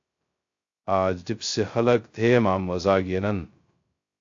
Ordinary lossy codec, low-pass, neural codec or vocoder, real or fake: AAC, 48 kbps; 7.2 kHz; codec, 16 kHz, 0.2 kbps, FocalCodec; fake